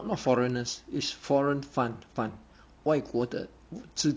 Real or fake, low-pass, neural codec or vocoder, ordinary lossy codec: real; none; none; none